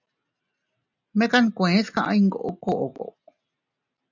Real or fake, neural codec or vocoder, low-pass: real; none; 7.2 kHz